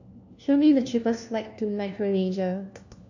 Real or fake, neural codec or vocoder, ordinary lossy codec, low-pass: fake; codec, 16 kHz, 1 kbps, FunCodec, trained on LibriTTS, 50 frames a second; none; 7.2 kHz